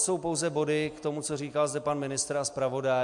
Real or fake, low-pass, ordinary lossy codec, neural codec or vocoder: real; 10.8 kHz; MP3, 64 kbps; none